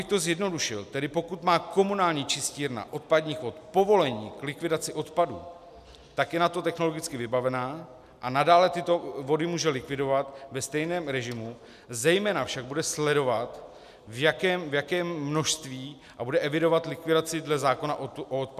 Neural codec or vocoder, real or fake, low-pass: none; real; 14.4 kHz